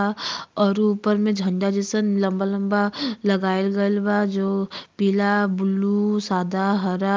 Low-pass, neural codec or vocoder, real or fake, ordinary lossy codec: 7.2 kHz; none; real; Opus, 32 kbps